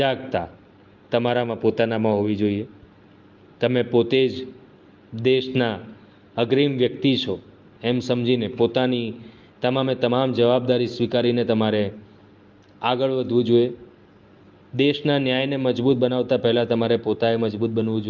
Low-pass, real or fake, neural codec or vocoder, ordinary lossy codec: 7.2 kHz; real; none; Opus, 32 kbps